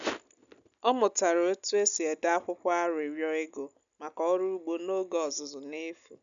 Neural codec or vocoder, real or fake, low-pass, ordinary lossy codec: none; real; 7.2 kHz; none